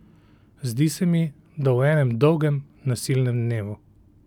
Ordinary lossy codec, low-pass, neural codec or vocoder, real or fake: none; 19.8 kHz; none; real